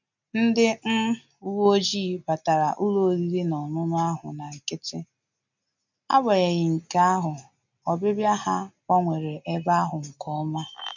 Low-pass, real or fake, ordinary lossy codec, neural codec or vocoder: 7.2 kHz; real; none; none